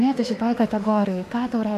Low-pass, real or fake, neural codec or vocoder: 14.4 kHz; fake; autoencoder, 48 kHz, 32 numbers a frame, DAC-VAE, trained on Japanese speech